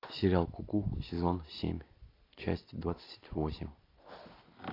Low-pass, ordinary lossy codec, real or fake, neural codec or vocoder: 5.4 kHz; AAC, 24 kbps; real; none